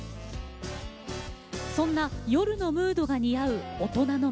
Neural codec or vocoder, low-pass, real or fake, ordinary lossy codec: none; none; real; none